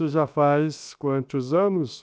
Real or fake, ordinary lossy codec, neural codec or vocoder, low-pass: fake; none; codec, 16 kHz, 0.7 kbps, FocalCodec; none